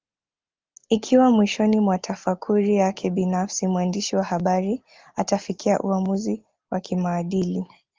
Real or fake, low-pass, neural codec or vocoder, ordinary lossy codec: real; 7.2 kHz; none; Opus, 32 kbps